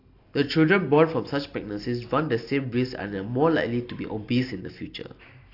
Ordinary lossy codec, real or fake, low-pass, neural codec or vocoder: AAC, 32 kbps; real; 5.4 kHz; none